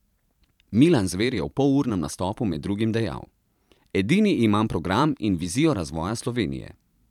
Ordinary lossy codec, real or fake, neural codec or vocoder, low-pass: none; fake; vocoder, 44.1 kHz, 128 mel bands every 512 samples, BigVGAN v2; 19.8 kHz